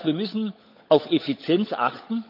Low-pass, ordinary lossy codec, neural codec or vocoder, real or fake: 5.4 kHz; AAC, 48 kbps; codec, 16 kHz, 16 kbps, FunCodec, trained on LibriTTS, 50 frames a second; fake